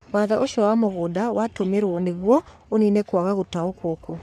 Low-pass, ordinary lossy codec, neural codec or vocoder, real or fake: 14.4 kHz; none; codec, 44.1 kHz, 3.4 kbps, Pupu-Codec; fake